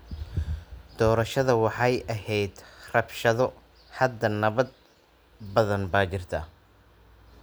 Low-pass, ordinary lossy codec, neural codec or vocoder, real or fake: none; none; none; real